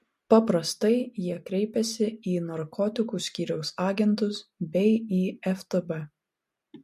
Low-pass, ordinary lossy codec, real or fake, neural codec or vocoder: 14.4 kHz; MP3, 64 kbps; real; none